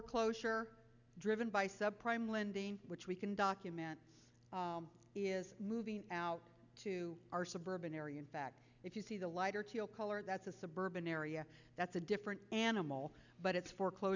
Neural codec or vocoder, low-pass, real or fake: none; 7.2 kHz; real